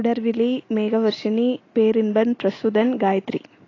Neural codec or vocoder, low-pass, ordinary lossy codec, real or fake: none; 7.2 kHz; AAC, 32 kbps; real